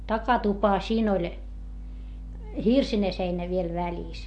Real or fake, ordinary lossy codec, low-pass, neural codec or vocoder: real; MP3, 48 kbps; 10.8 kHz; none